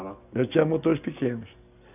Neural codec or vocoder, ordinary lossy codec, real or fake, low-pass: none; none; real; 3.6 kHz